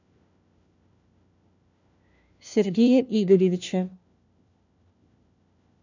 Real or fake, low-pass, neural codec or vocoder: fake; 7.2 kHz; codec, 16 kHz, 1 kbps, FunCodec, trained on LibriTTS, 50 frames a second